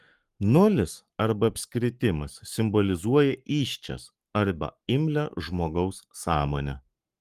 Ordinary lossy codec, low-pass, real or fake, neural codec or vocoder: Opus, 32 kbps; 14.4 kHz; fake; codec, 44.1 kHz, 7.8 kbps, Pupu-Codec